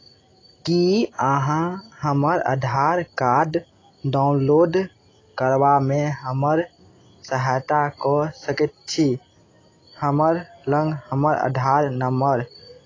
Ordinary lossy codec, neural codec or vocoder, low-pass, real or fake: AAC, 32 kbps; none; 7.2 kHz; real